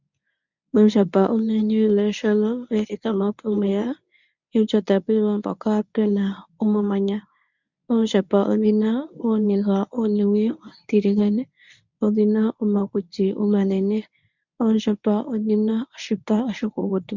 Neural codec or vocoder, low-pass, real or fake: codec, 24 kHz, 0.9 kbps, WavTokenizer, medium speech release version 1; 7.2 kHz; fake